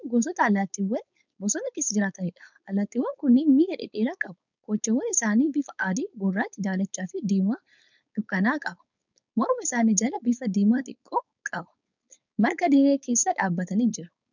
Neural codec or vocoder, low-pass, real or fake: codec, 16 kHz, 4.8 kbps, FACodec; 7.2 kHz; fake